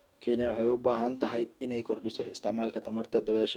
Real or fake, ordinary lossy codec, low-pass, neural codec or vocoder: fake; none; 19.8 kHz; codec, 44.1 kHz, 2.6 kbps, DAC